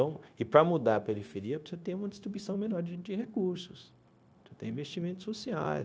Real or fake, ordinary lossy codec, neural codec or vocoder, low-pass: fake; none; codec, 16 kHz, 0.9 kbps, LongCat-Audio-Codec; none